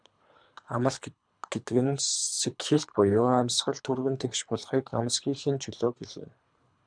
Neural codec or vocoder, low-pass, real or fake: codec, 24 kHz, 3 kbps, HILCodec; 9.9 kHz; fake